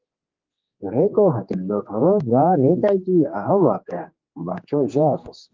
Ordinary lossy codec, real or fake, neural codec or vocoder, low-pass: Opus, 32 kbps; fake; codec, 16 kHz, 2 kbps, X-Codec, HuBERT features, trained on general audio; 7.2 kHz